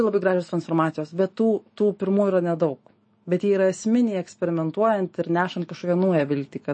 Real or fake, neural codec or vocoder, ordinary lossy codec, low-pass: fake; autoencoder, 48 kHz, 128 numbers a frame, DAC-VAE, trained on Japanese speech; MP3, 32 kbps; 9.9 kHz